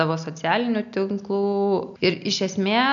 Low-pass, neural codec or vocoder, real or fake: 7.2 kHz; none; real